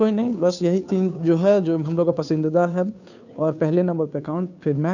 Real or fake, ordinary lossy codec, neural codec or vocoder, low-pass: fake; none; codec, 16 kHz, 2 kbps, FunCodec, trained on Chinese and English, 25 frames a second; 7.2 kHz